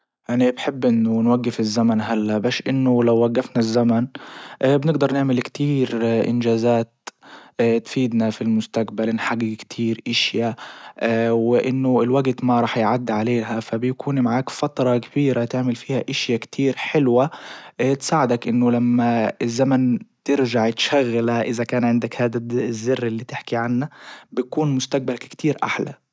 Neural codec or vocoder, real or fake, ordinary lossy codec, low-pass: none; real; none; none